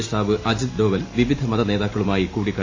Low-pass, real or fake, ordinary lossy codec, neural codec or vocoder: 7.2 kHz; real; AAC, 32 kbps; none